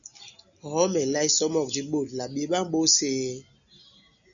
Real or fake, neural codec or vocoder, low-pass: real; none; 7.2 kHz